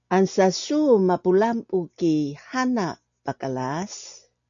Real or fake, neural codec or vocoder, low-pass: real; none; 7.2 kHz